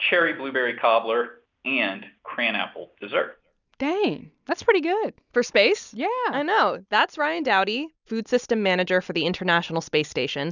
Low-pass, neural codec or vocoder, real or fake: 7.2 kHz; none; real